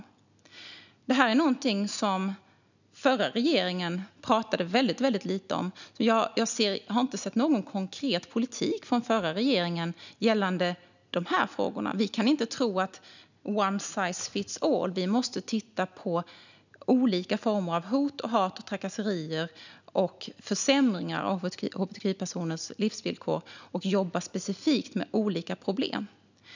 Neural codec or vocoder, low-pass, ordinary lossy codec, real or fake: none; 7.2 kHz; none; real